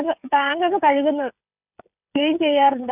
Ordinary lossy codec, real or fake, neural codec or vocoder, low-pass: none; fake; codec, 16 kHz, 16 kbps, FreqCodec, smaller model; 3.6 kHz